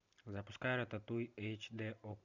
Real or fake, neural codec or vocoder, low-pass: real; none; 7.2 kHz